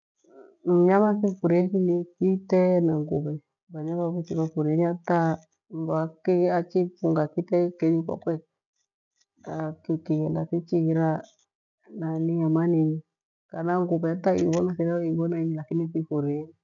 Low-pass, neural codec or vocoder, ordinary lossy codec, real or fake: 7.2 kHz; none; none; real